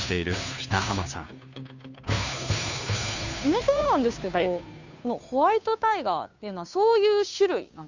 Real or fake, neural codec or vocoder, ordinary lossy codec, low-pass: fake; codec, 16 kHz, 2 kbps, FunCodec, trained on Chinese and English, 25 frames a second; none; 7.2 kHz